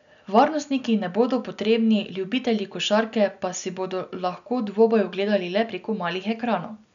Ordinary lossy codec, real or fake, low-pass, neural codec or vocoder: none; real; 7.2 kHz; none